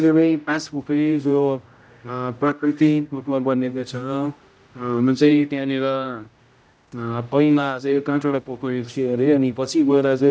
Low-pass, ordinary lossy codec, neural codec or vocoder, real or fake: none; none; codec, 16 kHz, 0.5 kbps, X-Codec, HuBERT features, trained on general audio; fake